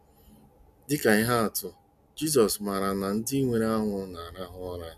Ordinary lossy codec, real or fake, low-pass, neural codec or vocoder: none; real; 14.4 kHz; none